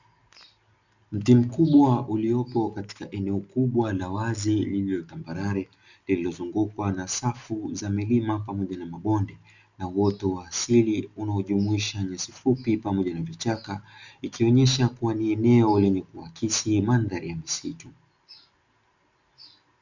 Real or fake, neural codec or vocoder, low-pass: real; none; 7.2 kHz